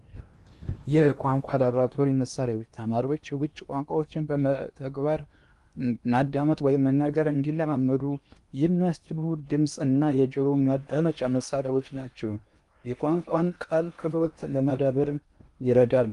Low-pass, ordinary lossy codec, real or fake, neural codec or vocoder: 10.8 kHz; Opus, 32 kbps; fake; codec, 16 kHz in and 24 kHz out, 0.8 kbps, FocalCodec, streaming, 65536 codes